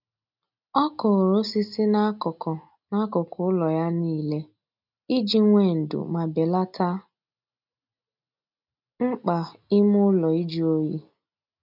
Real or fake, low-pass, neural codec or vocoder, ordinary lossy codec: real; 5.4 kHz; none; none